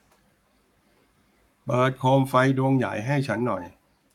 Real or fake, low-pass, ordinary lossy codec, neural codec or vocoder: fake; 19.8 kHz; none; codec, 44.1 kHz, 7.8 kbps, Pupu-Codec